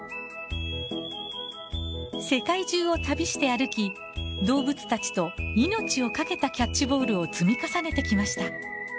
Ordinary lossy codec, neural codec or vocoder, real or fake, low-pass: none; none; real; none